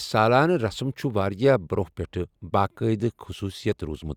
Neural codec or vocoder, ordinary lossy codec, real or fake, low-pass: none; Opus, 64 kbps; real; 19.8 kHz